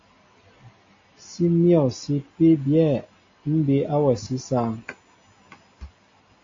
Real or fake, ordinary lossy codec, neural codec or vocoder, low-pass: real; AAC, 32 kbps; none; 7.2 kHz